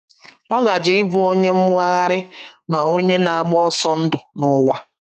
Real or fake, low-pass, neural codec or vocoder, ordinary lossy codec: fake; 14.4 kHz; codec, 32 kHz, 1.9 kbps, SNAC; none